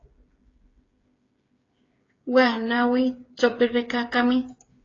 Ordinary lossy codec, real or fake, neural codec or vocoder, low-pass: AAC, 32 kbps; fake; codec, 16 kHz, 8 kbps, FreqCodec, smaller model; 7.2 kHz